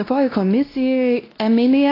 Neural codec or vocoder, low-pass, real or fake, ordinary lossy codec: codec, 16 kHz, 1 kbps, X-Codec, WavLM features, trained on Multilingual LibriSpeech; 5.4 kHz; fake; AAC, 24 kbps